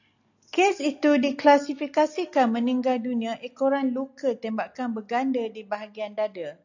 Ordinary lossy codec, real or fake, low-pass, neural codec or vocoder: AAC, 48 kbps; real; 7.2 kHz; none